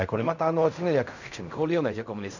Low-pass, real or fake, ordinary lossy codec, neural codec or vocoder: 7.2 kHz; fake; none; codec, 16 kHz in and 24 kHz out, 0.4 kbps, LongCat-Audio-Codec, fine tuned four codebook decoder